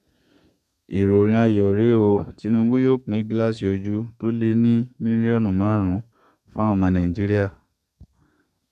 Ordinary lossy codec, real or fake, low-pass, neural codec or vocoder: none; fake; 14.4 kHz; codec, 32 kHz, 1.9 kbps, SNAC